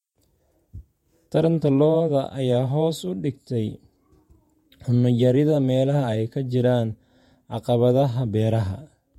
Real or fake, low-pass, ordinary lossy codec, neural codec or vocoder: fake; 19.8 kHz; MP3, 64 kbps; vocoder, 48 kHz, 128 mel bands, Vocos